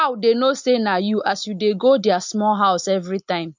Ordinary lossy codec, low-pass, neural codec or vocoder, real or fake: MP3, 64 kbps; 7.2 kHz; none; real